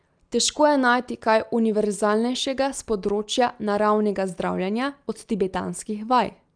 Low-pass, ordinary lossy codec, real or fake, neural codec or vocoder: 9.9 kHz; Opus, 32 kbps; real; none